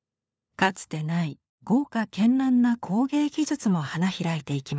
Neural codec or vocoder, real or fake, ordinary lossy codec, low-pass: codec, 16 kHz, 4 kbps, FunCodec, trained on LibriTTS, 50 frames a second; fake; none; none